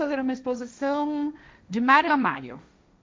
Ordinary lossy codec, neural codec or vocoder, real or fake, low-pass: none; codec, 16 kHz, 1.1 kbps, Voila-Tokenizer; fake; none